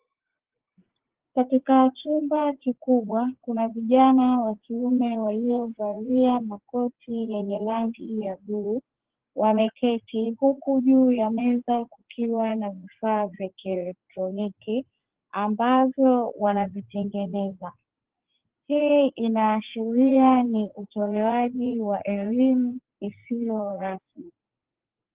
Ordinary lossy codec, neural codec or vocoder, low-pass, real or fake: Opus, 16 kbps; vocoder, 44.1 kHz, 80 mel bands, Vocos; 3.6 kHz; fake